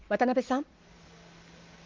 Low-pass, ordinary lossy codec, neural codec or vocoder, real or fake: 7.2 kHz; Opus, 24 kbps; none; real